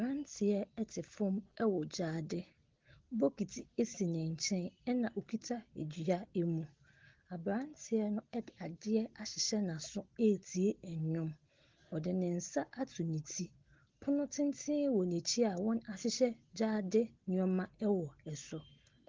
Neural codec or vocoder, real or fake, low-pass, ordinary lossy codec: none; real; 7.2 kHz; Opus, 16 kbps